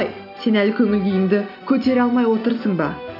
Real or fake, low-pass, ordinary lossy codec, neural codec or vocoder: real; 5.4 kHz; none; none